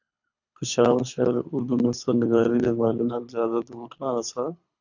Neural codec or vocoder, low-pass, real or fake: codec, 24 kHz, 3 kbps, HILCodec; 7.2 kHz; fake